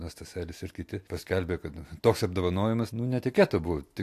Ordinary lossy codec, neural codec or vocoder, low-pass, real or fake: AAC, 64 kbps; none; 14.4 kHz; real